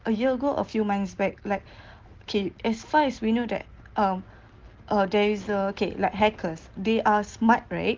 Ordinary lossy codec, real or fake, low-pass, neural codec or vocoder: Opus, 16 kbps; real; 7.2 kHz; none